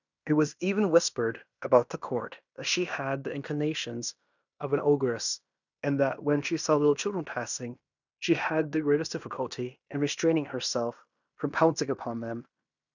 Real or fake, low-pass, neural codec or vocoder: fake; 7.2 kHz; codec, 16 kHz in and 24 kHz out, 0.9 kbps, LongCat-Audio-Codec, fine tuned four codebook decoder